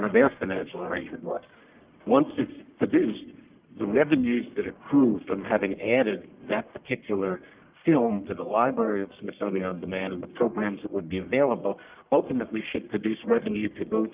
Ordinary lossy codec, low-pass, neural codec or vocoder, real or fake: Opus, 32 kbps; 3.6 kHz; codec, 44.1 kHz, 1.7 kbps, Pupu-Codec; fake